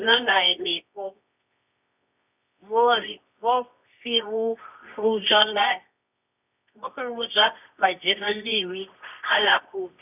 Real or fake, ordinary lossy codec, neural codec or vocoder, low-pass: fake; none; codec, 24 kHz, 0.9 kbps, WavTokenizer, medium music audio release; 3.6 kHz